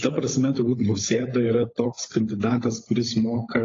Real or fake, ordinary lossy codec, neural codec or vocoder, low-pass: fake; AAC, 32 kbps; codec, 16 kHz, 16 kbps, FunCodec, trained on LibriTTS, 50 frames a second; 7.2 kHz